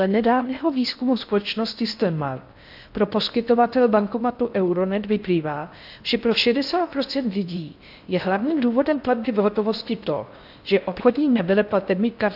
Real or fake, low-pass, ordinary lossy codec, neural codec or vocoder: fake; 5.4 kHz; AAC, 48 kbps; codec, 16 kHz in and 24 kHz out, 0.6 kbps, FocalCodec, streaming, 4096 codes